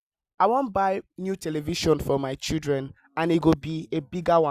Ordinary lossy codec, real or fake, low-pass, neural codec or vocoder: Opus, 64 kbps; real; 14.4 kHz; none